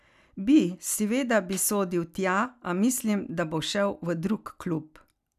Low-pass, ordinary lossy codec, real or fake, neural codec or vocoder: 14.4 kHz; none; real; none